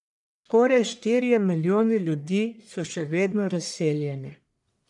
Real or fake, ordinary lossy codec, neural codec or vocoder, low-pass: fake; none; codec, 44.1 kHz, 1.7 kbps, Pupu-Codec; 10.8 kHz